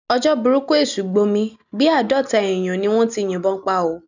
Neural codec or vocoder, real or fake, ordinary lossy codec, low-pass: none; real; none; 7.2 kHz